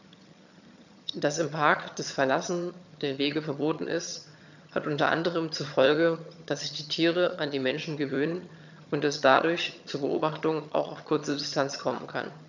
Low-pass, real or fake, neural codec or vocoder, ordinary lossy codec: 7.2 kHz; fake; vocoder, 22.05 kHz, 80 mel bands, HiFi-GAN; none